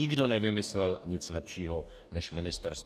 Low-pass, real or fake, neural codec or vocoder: 14.4 kHz; fake; codec, 44.1 kHz, 2.6 kbps, DAC